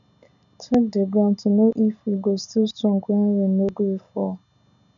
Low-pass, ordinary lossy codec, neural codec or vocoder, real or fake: 7.2 kHz; none; none; real